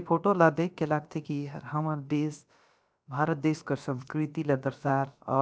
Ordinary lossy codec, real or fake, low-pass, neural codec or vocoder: none; fake; none; codec, 16 kHz, about 1 kbps, DyCAST, with the encoder's durations